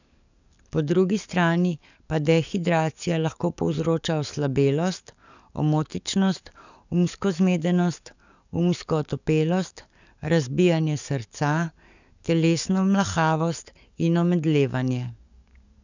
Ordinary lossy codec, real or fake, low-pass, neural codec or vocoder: none; fake; 7.2 kHz; codec, 44.1 kHz, 7.8 kbps, DAC